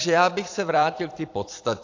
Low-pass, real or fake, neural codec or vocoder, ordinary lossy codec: 7.2 kHz; fake; vocoder, 44.1 kHz, 128 mel bands every 256 samples, BigVGAN v2; AAC, 48 kbps